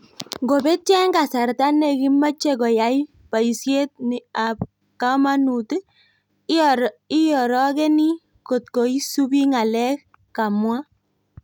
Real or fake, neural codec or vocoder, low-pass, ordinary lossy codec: real; none; 19.8 kHz; none